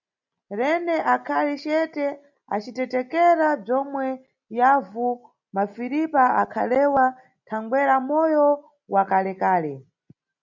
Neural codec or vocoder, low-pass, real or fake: none; 7.2 kHz; real